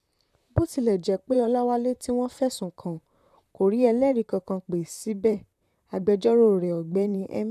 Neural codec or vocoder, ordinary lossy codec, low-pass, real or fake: vocoder, 44.1 kHz, 128 mel bands, Pupu-Vocoder; none; 14.4 kHz; fake